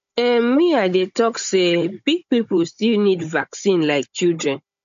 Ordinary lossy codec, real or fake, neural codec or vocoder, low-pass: MP3, 48 kbps; fake; codec, 16 kHz, 16 kbps, FunCodec, trained on Chinese and English, 50 frames a second; 7.2 kHz